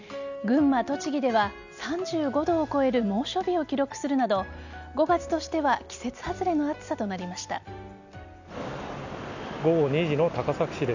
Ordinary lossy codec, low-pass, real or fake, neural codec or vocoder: none; 7.2 kHz; real; none